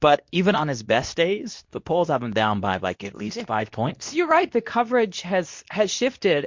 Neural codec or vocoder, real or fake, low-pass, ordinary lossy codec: codec, 24 kHz, 0.9 kbps, WavTokenizer, medium speech release version 2; fake; 7.2 kHz; MP3, 48 kbps